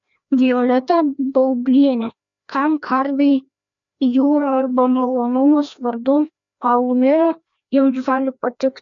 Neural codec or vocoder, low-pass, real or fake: codec, 16 kHz, 1 kbps, FreqCodec, larger model; 7.2 kHz; fake